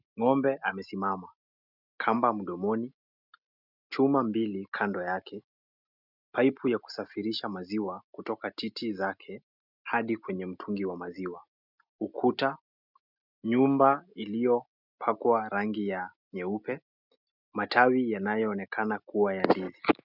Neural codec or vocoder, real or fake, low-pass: none; real; 5.4 kHz